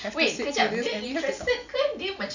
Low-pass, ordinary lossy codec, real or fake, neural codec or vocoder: 7.2 kHz; none; real; none